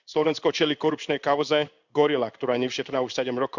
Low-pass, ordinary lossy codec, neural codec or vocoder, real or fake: 7.2 kHz; none; codec, 16 kHz in and 24 kHz out, 1 kbps, XY-Tokenizer; fake